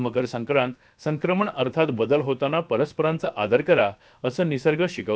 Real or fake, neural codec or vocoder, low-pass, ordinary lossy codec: fake; codec, 16 kHz, about 1 kbps, DyCAST, with the encoder's durations; none; none